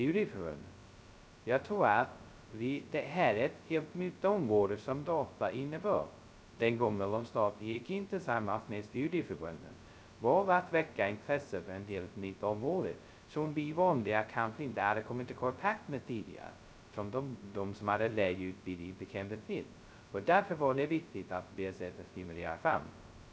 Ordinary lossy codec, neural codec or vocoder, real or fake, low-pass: none; codec, 16 kHz, 0.2 kbps, FocalCodec; fake; none